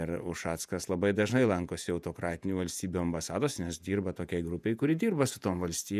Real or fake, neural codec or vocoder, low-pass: fake; vocoder, 48 kHz, 128 mel bands, Vocos; 14.4 kHz